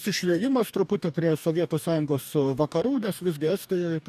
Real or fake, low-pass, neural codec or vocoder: fake; 14.4 kHz; codec, 44.1 kHz, 2.6 kbps, DAC